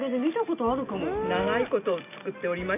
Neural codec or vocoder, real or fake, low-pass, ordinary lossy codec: none; real; 3.6 kHz; none